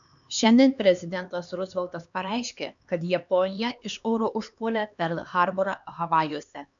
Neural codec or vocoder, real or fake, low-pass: codec, 16 kHz, 2 kbps, X-Codec, HuBERT features, trained on LibriSpeech; fake; 7.2 kHz